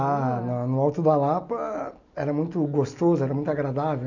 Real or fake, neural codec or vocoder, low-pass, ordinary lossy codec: real; none; 7.2 kHz; none